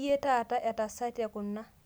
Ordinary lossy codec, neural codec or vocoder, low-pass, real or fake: none; none; none; real